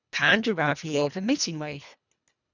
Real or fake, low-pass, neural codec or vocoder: fake; 7.2 kHz; codec, 24 kHz, 1.5 kbps, HILCodec